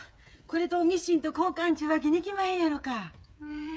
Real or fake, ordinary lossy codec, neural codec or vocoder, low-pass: fake; none; codec, 16 kHz, 8 kbps, FreqCodec, smaller model; none